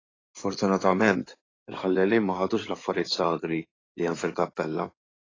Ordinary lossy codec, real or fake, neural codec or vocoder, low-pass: AAC, 32 kbps; fake; codec, 16 kHz in and 24 kHz out, 2.2 kbps, FireRedTTS-2 codec; 7.2 kHz